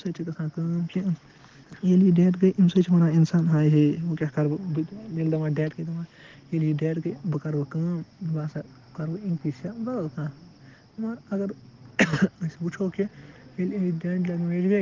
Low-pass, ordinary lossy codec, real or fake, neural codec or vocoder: 7.2 kHz; Opus, 16 kbps; real; none